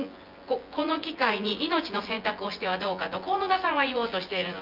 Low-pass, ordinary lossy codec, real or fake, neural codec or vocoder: 5.4 kHz; Opus, 24 kbps; fake; vocoder, 24 kHz, 100 mel bands, Vocos